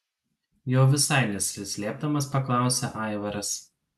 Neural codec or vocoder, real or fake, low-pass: none; real; 14.4 kHz